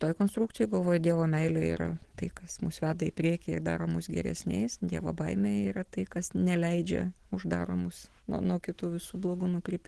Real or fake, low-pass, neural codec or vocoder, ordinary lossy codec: real; 10.8 kHz; none; Opus, 16 kbps